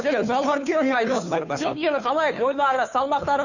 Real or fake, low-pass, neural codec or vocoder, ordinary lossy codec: fake; 7.2 kHz; codec, 16 kHz, 2 kbps, FunCodec, trained on Chinese and English, 25 frames a second; none